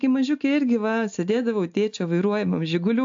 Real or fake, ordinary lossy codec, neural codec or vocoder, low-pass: real; AAC, 64 kbps; none; 7.2 kHz